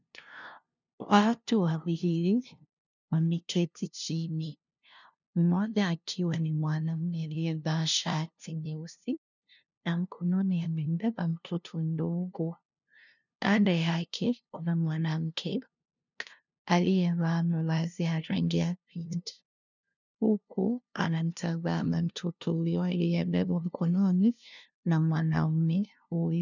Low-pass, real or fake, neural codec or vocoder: 7.2 kHz; fake; codec, 16 kHz, 0.5 kbps, FunCodec, trained on LibriTTS, 25 frames a second